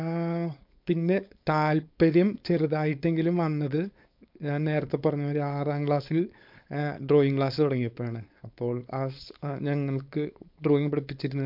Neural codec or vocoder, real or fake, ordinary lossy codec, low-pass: codec, 16 kHz, 4.8 kbps, FACodec; fake; MP3, 48 kbps; 5.4 kHz